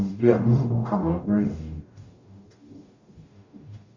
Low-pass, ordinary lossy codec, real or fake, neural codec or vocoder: 7.2 kHz; Opus, 64 kbps; fake; codec, 44.1 kHz, 0.9 kbps, DAC